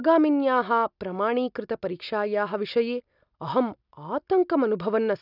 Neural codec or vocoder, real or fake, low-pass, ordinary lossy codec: none; real; 5.4 kHz; none